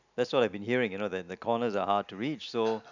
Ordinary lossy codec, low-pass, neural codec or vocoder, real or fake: none; 7.2 kHz; none; real